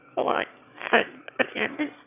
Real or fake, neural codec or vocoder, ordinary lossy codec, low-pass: fake; autoencoder, 22.05 kHz, a latent of 192 numbers a frame, VITS, trained on one speaker; none; 3.6 kHz